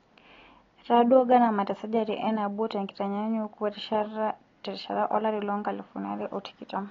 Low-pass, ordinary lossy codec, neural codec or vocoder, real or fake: 7.2 kHz; AAC, 32 kbps; none; real